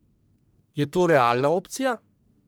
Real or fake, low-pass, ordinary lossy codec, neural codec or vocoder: fake; none; none; codec, 44.1 kHz, 1.7 kbps, Pupu-Codec